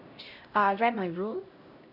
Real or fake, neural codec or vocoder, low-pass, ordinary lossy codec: fake; codec, 16 kHz, 0.5 kbps, X-Codec, HuBERT features, trained on LibriSpeech; 5.4 kHz; none